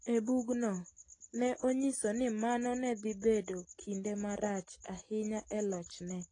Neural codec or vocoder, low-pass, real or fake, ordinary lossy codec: none; 9.9 kHz; real; AAC, 32 kbps